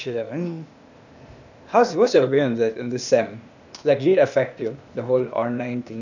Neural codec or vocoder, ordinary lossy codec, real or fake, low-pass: codec, 16 kHz, 0.8 kbps, ZipCodec; none; fake; 7.2 kHz